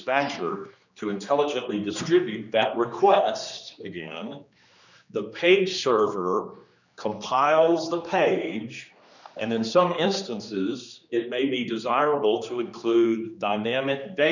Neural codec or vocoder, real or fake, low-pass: codec, 16 kHz, 2 kbps, X-Codec, HuBERT features, trained on general audio; fake; 7.2 kHz